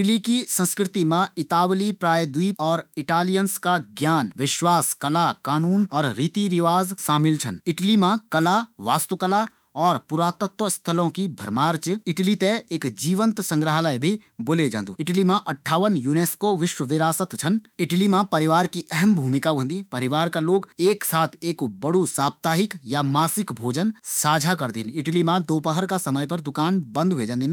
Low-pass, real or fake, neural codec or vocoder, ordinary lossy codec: none; fake; autoencoder, 48 kHz, 32 numbers a frame, DAC-VAE, trained on Japanese speech; none